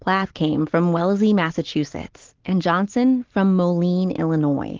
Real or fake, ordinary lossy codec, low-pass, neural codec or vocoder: real; Opus, 16 kbps; 7.2 kHz; none